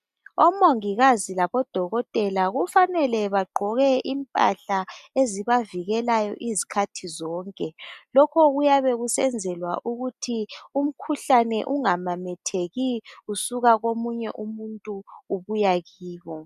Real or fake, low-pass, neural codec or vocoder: real; 14.4 kHz; none